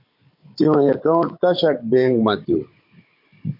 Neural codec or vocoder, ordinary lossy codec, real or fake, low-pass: codec, 16 kHz, 16 kbps, FunCodec, trained on Chinese and English, 50 frames a second; MP3, 32 kbps; fake; 5.4 kHz